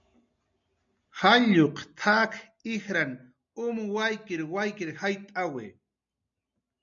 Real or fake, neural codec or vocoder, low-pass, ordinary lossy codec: real; none; 7.2 kHz; MP3, 64 kbps